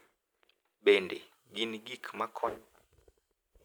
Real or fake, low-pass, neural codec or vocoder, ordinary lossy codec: real; none; none; none